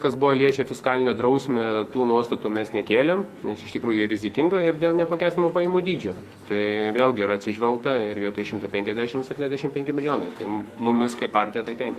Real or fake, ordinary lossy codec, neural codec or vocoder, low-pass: fake; Opus, 64 kbps; codec, 44.1 kHz, 2.6 kbps, SNAC; 14.4 kHz